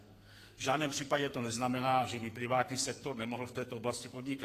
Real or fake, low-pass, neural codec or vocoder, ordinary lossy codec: fake; 14.4 kHz; codec, 44.1 kHz, 2.6 kbps, SNAC; AAC, 48 kbps